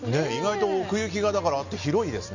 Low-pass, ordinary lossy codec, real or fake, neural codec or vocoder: 7.2 kHz; none; real; none